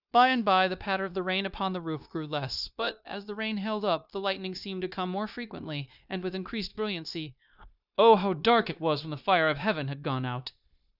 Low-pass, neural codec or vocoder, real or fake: 5.4 kHz; codec, 16 kHz, 0.9 kbps, LongCat-Audio-Codec; fake